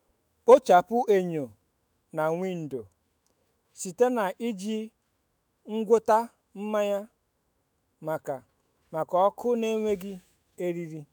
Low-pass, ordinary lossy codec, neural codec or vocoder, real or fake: none; none; autoencoder, 48 kHz, 128 numbers a frame, DAC-VAE, trained on Japanese speech; fake